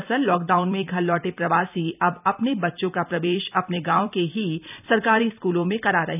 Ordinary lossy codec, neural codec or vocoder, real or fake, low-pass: none; vocoder, 44.1 kHz, 128 mel bands every 256 samples, BigVGAN v2; fake; 3.6 kHz